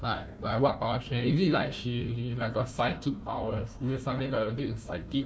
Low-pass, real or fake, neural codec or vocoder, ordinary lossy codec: none; fake; codec, 16 kHz, 1 kbps, FunCodec, trained on Chinese and English, 50 frames a second; none